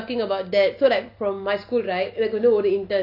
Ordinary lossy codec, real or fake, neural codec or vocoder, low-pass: none; real; none; 5.4 kHz